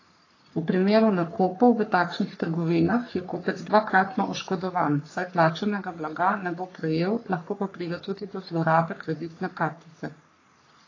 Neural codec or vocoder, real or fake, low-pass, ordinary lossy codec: codec, 44.1 kHz, 3.4 kbps, Pupu-Codec; fake; 7.2 kHz; AAC, 32 kbps